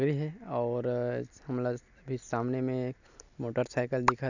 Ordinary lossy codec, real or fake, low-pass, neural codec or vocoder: none; real; 7.2 kHz; none